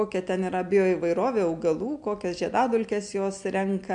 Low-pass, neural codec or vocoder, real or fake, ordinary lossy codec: 9.9 kHz; none; real; MP3, 64 kbps